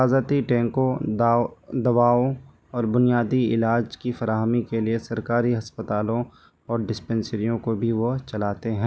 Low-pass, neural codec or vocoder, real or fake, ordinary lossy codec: none; none; real; none